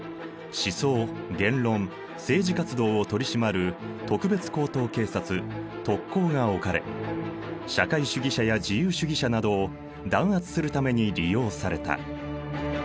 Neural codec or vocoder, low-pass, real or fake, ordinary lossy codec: none; none; real; none